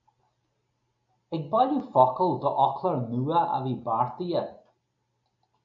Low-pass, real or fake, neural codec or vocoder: 7.2 kHz; real; none